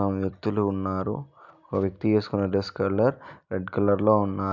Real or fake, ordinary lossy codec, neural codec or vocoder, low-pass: real; none; none; 7.2 kHz